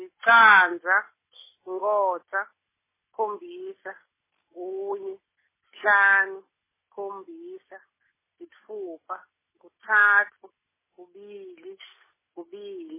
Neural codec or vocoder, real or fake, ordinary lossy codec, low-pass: none; real; MP3, 16 kbps; 3.6 kHz